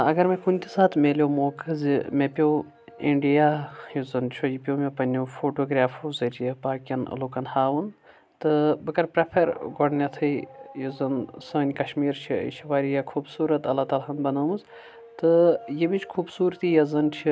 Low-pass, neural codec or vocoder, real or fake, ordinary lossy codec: none; none; real; none